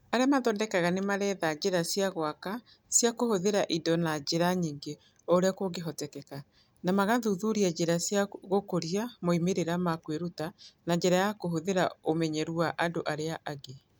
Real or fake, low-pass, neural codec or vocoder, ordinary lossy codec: real; none; none; none